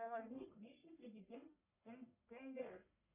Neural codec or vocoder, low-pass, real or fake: codec, 44.1 kHz, 1.7 kbps, Pupu-Codec; 3.6 kHz; fake